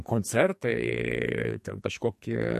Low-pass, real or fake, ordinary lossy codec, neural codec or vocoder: 14.4 kHz; fake; MP3, 64 kbps; codec, 44.1 kHz, 2.6 kbps, SNAC